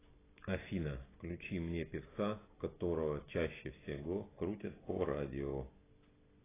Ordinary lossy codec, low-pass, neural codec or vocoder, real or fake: AAC, 16 kbps; 3.6 kHz; none; real